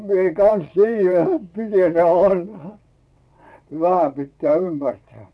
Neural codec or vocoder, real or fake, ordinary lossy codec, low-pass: vocoder, 22.05 kHz, 80 mel bands, WaveNeXt; fake; none; none